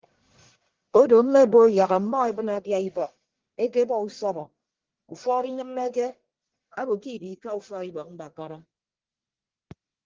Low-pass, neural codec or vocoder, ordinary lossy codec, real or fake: 7.2 kHz; codec, 44.1 kHz, 1.7 kbps, Pupu-Codec; Opus, 16 kbps; fake